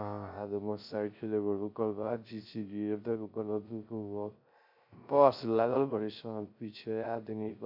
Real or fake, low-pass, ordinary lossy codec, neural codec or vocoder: fake; 5.4 kHz; MP3, 48 kbps; codec, 16 kHz, 0.3 kbps, FocalCodec